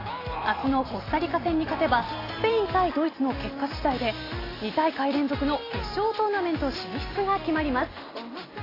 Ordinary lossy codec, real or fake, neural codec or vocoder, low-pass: AAC, 24 kbps; real; none; 5.4 kHz